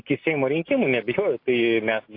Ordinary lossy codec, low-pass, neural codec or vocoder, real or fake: AAC, 32 kbps; 5.4 kHz; none; real